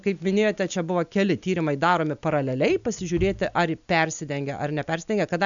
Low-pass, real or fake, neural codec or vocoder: 7.2 kHz; real; none